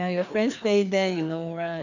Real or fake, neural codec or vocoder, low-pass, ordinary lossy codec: fake; codec, 16 kHz, 2 kbps, FreqCodec, larger model; 7.2 kHz; none